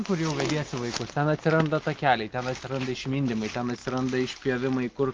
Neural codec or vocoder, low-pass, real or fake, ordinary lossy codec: none; 7.2 kHz; real; Opus, 24 kbps